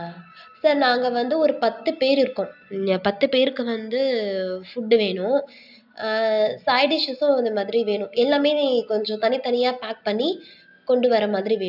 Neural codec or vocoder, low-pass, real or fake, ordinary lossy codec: none; 5.4 kHz; real; none